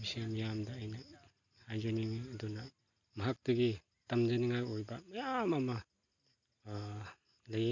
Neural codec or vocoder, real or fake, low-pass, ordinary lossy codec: none; real; 7.2 kHz; none